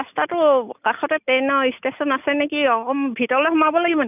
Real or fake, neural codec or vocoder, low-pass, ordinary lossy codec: real; none; 3.6 kHz; none